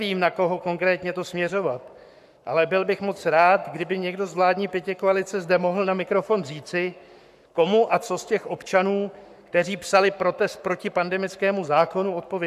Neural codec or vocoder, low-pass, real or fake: codec, 44.1 kHz, 7.8 kbps, Pupu-Codec; 14.4 kHz; fake